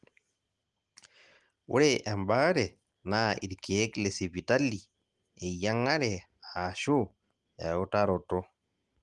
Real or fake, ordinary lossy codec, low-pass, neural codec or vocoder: real; Opus, 24 kbps; 10.8 kHz; none